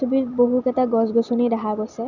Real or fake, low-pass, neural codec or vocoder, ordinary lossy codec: real; 7.2 kHz; none; none